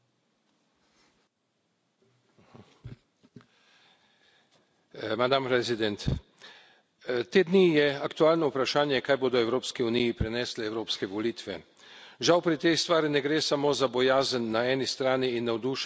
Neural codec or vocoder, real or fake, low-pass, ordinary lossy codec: none; real; none; none